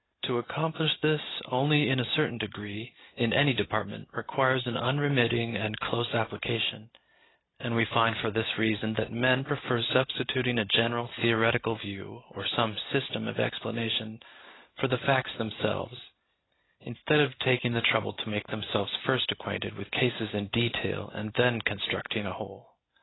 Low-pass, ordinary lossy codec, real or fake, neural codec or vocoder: 7.2 kHz; AAC, 16 kbps; real; none